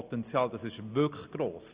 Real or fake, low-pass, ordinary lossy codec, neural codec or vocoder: real; 3.6 kHz; Opus, 64 kbps; none